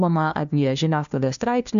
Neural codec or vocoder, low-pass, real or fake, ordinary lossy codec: codec, 16 kHz, 1 kbps, FunCodec, trained on LibriTTS, 50 frames a second; 7.2 kHz; fake; AAC, 96 kbps